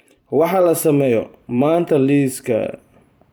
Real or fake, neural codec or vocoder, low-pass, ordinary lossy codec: fake; vocoder, 44.1 kHz, 128 mel bands every 512 samples, BigVGAN v2; none; none